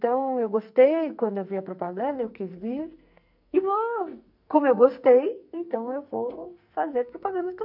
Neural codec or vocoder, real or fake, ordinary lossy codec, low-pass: codec, 44.1 kHz, 2.6 kbps, SNAC; fake; none; 5.4 kHz